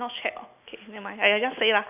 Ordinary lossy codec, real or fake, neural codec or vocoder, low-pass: none; real; none; 3.6 kHz